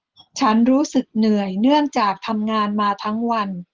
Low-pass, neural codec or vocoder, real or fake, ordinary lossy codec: 7.2 kHz; none; real; Opus, 16 kbps